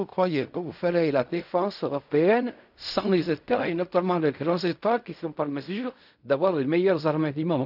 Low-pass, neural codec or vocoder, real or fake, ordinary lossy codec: 5.4 kHz; codec, 16 kHz in and 24 kHz out, 0.4 kbps, LongCat-Audio-Codec, fine tuned four codebook decoder; fake; none